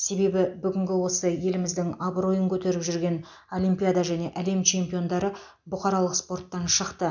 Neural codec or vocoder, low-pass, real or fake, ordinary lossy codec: none; 7.2 kHz; real; none